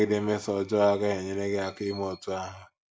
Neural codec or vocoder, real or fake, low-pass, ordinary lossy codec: none; real; none; none